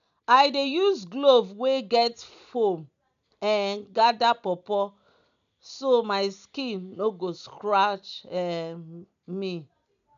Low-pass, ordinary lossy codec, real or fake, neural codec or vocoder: 7.2 kHz; none; real; none